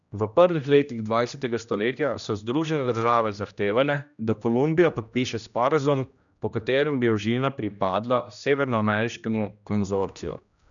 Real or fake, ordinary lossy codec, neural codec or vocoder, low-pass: fake; none; codec, 16 kHz, 1 kbps, X-Codec, HuBERT features, trained on general audio; 7.2 kHz